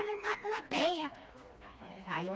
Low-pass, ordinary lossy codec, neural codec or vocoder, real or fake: none; none; codec, 16 kHz, 2 kbps, FreqCodec, smaller model; fake